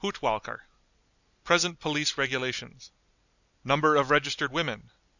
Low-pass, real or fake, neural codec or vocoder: 7.2 kHz; real; none